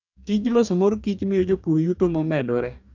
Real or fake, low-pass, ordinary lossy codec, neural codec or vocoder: fake; 7.2 kHz; none; codec, 44.1 kHz, 2.6 kbps, DAC